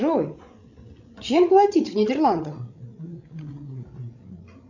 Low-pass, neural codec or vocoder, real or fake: 7.2 kHz; codec, 16 kHz, 16 kbps, FreqCodec, larger model; fake